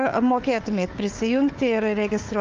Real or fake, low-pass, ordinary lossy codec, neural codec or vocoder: fake; 7.2 kHz; Opus, 32 kbps; codec, 16 kHz, 4 kbps, FunCodec, trained on Chinese and English, 50 frames a second